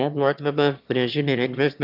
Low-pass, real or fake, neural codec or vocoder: 5.4 kHz; fake; autoencoder, 22.05 kHz, a latent of 192 numbers a frame, VITS, trained on one speaker